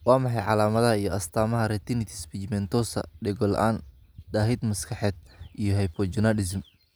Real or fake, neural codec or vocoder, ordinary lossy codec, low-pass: real; none; none; none